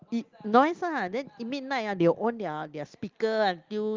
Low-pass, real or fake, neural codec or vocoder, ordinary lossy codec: 7.2 kHz; real; none; Opus, 32 kbps